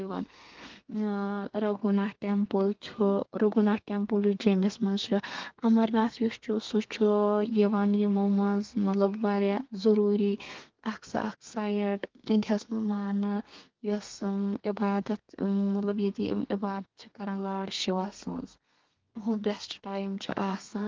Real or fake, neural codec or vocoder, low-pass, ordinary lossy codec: fake; codec, 32 kHz, 1.9 kbps, SNAC; 7.2 kHz; Opus, 32 kbps